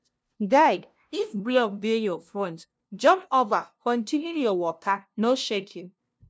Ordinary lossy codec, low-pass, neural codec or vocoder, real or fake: none; none; codec, 16 kHz, 0.5 kbps, FunCodec, trained on LibriTTS, 25 frames a second; fake